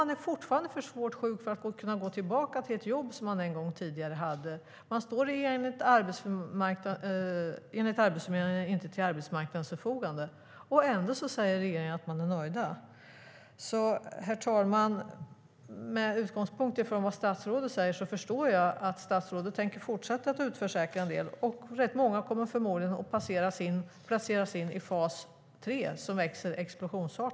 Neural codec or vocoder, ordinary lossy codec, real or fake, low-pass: none; none; real; none